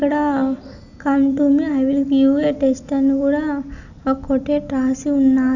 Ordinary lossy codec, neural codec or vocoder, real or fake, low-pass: none; none; real; 7.2 kHz